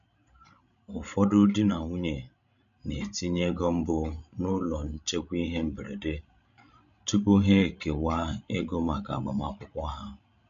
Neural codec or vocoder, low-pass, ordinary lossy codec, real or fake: codec, 16 kHz, 16 kbps, FreqCodec, larger model; 7.2 kHz; none; fake